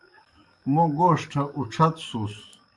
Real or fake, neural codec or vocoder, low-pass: fake; codec, 44.1 kHz, 7.8 kbps, DAC; 10.8 kHz